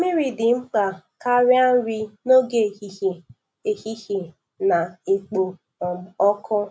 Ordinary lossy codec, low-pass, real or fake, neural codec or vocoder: none; none; real; none